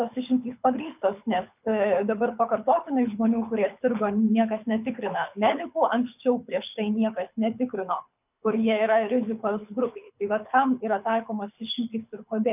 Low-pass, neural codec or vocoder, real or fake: 3.6 kHz; codec, 24 kHz, 6 kbps, HILCodec; fake